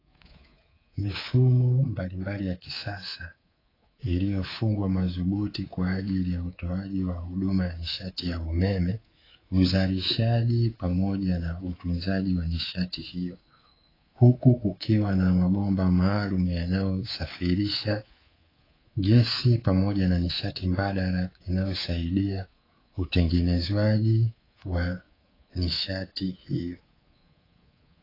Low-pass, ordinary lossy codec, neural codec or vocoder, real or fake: 5.4 kHz; AAC, 24 kbps; codec, 24 kHz, 3.1 kbps, DualCodec; fake